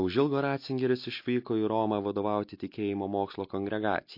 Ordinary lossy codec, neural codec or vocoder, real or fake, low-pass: MP3, 32 kbps; none; real; 5.4 kHz